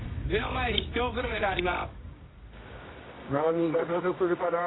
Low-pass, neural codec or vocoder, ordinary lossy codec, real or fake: 7.2 kHz; codec, 24 kHz, 0.9 kbps, WavTokenizer, medium music audio release; AAC, 16 kbps; fake